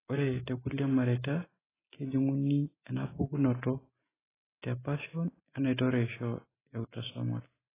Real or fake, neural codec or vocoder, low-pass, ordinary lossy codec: real; none; 3.6 kHz; AAC, 16 kbps